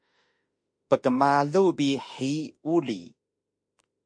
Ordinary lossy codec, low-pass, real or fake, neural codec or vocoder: MP3, 48 kbps; 9.9 kHz; fake; autoencoder, 48 kHz, 32 numbers a frame, DAC-VAE, trained on Japanese speech